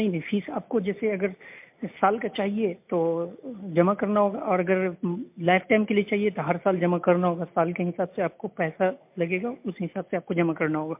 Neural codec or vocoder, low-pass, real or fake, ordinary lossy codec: none; 3.6 kHz; real; MP3, 32 kbps